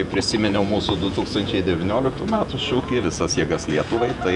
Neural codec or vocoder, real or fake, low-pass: vocoder, 48 kHz, 128 mel bands, Vocos; fake; 10.8 kHz